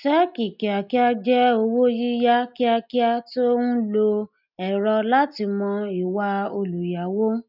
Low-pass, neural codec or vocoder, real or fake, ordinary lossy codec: 5.4 kHz; none; real; none